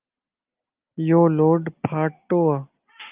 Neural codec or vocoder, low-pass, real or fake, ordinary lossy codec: none; 3.6 kHz; real; Opus, 24 kbps